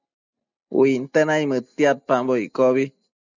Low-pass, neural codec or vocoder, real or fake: 7.2 kHz; none; real